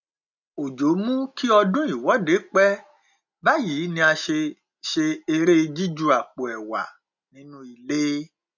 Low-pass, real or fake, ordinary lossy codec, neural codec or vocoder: 7.2 kHz; real; none; none